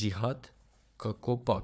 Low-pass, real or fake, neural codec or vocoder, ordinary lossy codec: none; fake; codec, 16 kHz, 4 kbps, FunCodec, trained on Chinese and English, 50 frames a second; none